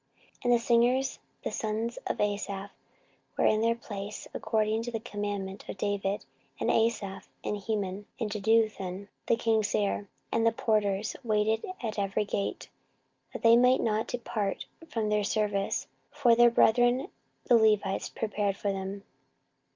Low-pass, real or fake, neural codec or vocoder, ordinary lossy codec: 7.2 kHz; real; none; Opus, 24 kbps